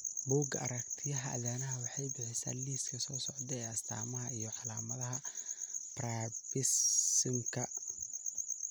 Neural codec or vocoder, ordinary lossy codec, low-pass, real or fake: none; none; none; real